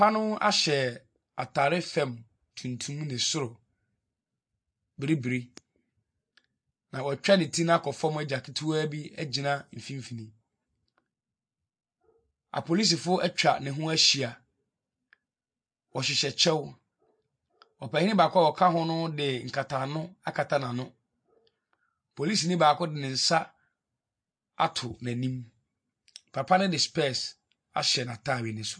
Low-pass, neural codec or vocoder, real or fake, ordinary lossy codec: 9.9 kHz; none; real; MP3, 48 kbps